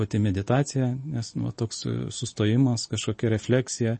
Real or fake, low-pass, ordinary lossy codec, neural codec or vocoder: real; 10.8 kHz; MP3, 32 kbps; none